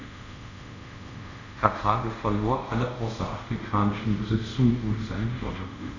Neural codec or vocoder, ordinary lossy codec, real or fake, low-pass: codec, 24 kHz, 0.5 kbps, DualCodec; none; fake; 7.2 kHz